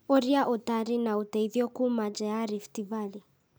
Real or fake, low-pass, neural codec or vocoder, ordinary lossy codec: real; none; none; none